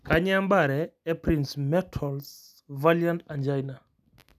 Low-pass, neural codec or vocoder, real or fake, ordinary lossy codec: 14.4 kHz; none; real; none